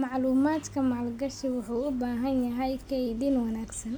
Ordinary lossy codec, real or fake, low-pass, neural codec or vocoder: none; real; none; none